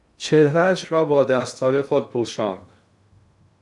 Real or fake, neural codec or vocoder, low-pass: fake; codec, 16 kHz in and 24 kHz out, 0.6 kbps, FocalCodec, streaming, 2048 codes; 10.8 kHz